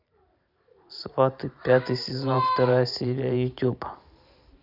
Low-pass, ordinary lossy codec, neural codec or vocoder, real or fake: 5.4 kHz; none; vocoder, 44.1 kHz, 128 mel bands every 512 samples, BigVGAN v2; fake